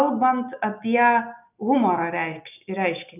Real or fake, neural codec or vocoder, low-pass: real; none; 3.6 kHz